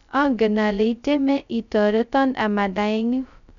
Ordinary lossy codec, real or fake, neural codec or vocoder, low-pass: none; fake; codec, 16 kHz, 0.2 kbps, FocalCodec; 7.2 kHz